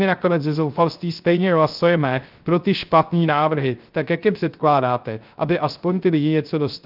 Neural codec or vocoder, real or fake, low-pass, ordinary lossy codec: codec, 16 kHz, 0.3 kbps, FocalCodec; fake; 5.4 kHz; Opus, 24 kbps